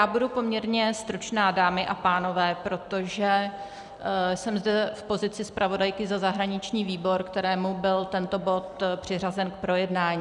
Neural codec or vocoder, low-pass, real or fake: none; 10.8 kHz; real